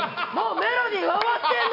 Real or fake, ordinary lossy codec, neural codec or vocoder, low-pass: real; AAC, 48 kbps; none; 5.4 kHz